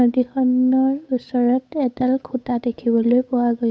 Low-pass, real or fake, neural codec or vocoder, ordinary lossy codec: none; fake; codec, 16 kHz, 8 kbps, FunCodec, trained on Chinese and English, 25 frames a second; none